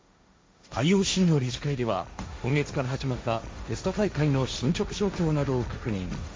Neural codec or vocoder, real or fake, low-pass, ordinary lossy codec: codec, 16 kHz, 1.1 kbps, Voila-Tokenizer; fake; none; none